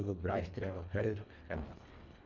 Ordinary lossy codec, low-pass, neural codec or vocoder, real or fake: MP3, 64 kbps; 7.2 kHz; codec, 24 kHz, 1.5 kbps, HILCodec; fake